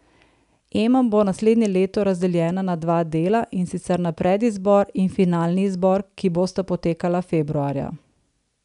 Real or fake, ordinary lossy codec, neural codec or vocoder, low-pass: real; none; none; 10.8 kHz